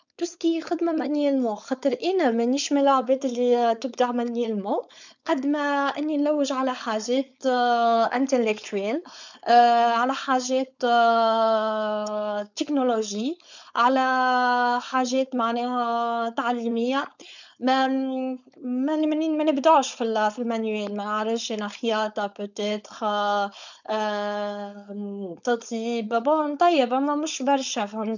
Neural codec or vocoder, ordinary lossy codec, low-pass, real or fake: codec, 16 kHz, 4.8 kbps, FACodec; none; 7.2 kHz; fake